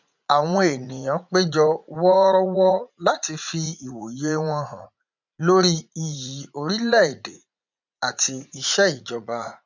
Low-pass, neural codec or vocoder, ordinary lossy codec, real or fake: 7.2 kHz; vocoder, 44.1 kHz, 80 mel bands, Vocos; none; fake